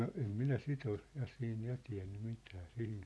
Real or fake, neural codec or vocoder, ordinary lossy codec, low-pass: real; none; none; none